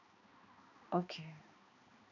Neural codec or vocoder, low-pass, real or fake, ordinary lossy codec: codec, 16 kHz, 2 kbps, X-Codec, HuBERT features, trained on balanced general audio; 7.2 kHz; fake; AAC, 48 kbps